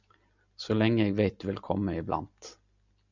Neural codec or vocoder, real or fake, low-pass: none; real; 7.2 kHz